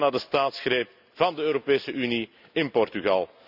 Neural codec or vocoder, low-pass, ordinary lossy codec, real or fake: none; 5.4 kHz; none; real